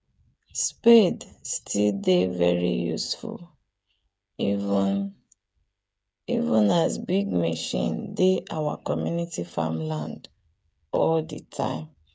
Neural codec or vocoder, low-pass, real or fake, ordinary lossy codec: codec, 16 kHz, 8 kbps, FreqCodec, smaller model; none; fake; none